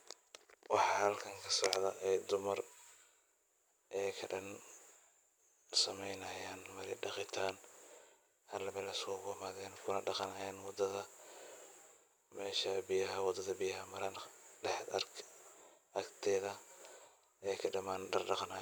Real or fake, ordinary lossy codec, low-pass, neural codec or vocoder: real; none; none; none